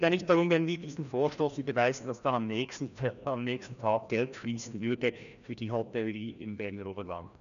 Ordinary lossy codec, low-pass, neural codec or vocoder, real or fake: none; 7.2 kHz; codec, 16 kHz, 1 kbps, FreqCodec, larger model; fake